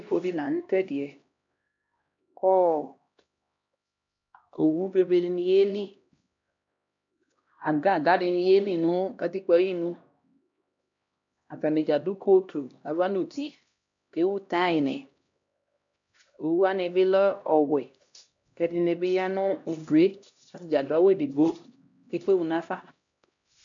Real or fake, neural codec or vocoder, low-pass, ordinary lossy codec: fake; codec, 16 kHz, 1 kbps, X-Codec, HuBERT features, trained on LibriSpeech; 7.2 kHz; MP3, 64 kbps